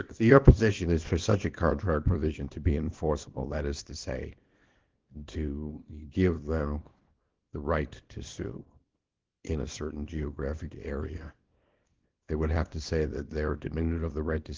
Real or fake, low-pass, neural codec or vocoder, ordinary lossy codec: fake; 7.2 kHz; codec, 24 kHz, 0.9 kbps, WavTokenizer, small release; Opus, 16 kbps